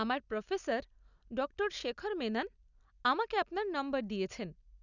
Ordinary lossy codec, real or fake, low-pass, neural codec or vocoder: none; real; 7.2 kHz; none